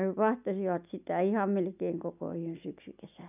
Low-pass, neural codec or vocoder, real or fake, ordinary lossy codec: 3.6 kHz; none; real; none